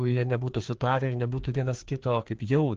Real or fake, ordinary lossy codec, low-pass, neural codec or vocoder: fake; Opus, 16 kbps; 7.2 kHz; codec, 16 kHz, 2 kbps, FreqCodec, larger model